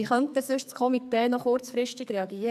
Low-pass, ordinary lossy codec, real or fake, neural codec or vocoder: 14.4 kHz; none; fake; codec, 44.1 kHz, 2.6 kbps, SNAC